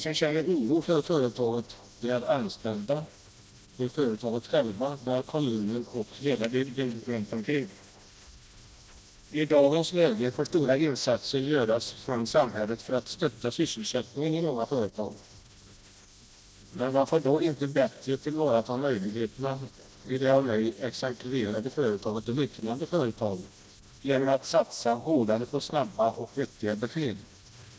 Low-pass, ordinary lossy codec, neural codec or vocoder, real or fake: none; none; codec, 16 kHz, 1 kbps, FreqCodec, smaller model; fake